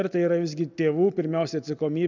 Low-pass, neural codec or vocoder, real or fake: 7.2 kHz; none; real